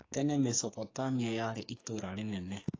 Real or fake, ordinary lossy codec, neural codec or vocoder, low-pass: fake; AAC, 32 kbps; codec, 44.1 kHz, 2.6 kbps, SNAC; 7.2 kHz